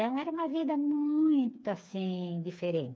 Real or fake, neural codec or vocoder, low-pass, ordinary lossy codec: fake; codec, 16 kHz, 4 kbps, FreqCodec, smaller model; none; none